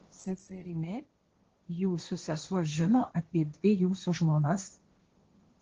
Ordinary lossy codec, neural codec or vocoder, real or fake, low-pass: Opus, 16 kbps; codec, 16 kHz, 1.1 kbps, Voila-Tokenizer; fake; 7.2 kHz